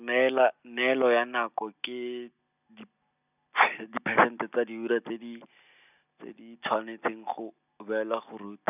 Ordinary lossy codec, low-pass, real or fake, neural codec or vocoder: none; 3.6 kHz; real; none